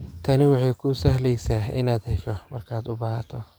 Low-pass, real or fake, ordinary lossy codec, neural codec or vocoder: none; fake; none; codec, 44.1 kHz, 7.8 kbps, DAC